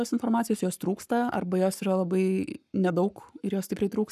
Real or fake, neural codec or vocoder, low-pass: fake; codec, 44.1 kHz, 7.8 kbps, Pupu-Codec; 14.4 kHz